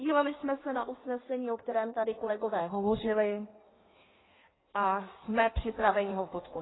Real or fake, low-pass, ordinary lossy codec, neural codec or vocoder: fake; 7.2 kHz; AAC, 16 kbps; codec, 16 kHz in and 24 kHz out, 1.1 kbps, FireRedTTS-2 codec